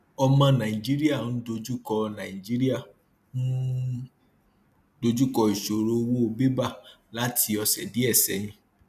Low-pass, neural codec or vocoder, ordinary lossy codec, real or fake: 14.4 kHz; none; none; real